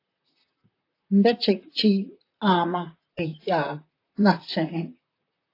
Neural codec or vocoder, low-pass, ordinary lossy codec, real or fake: vocoder, 44.1 kHz, 128 mel bands, Pupu-Vocoder; 5.4 kHz; AAC, 32 kbps; fake